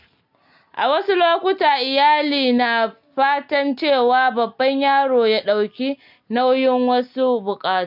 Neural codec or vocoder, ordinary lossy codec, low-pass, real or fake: none; none; 5.4 kHz; real